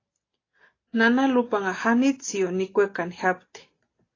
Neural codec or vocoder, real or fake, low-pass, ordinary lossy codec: none; real; 7.2 kHz; AAC, 32 kbps